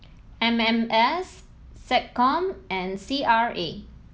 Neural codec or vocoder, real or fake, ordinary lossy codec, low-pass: none; real; none; none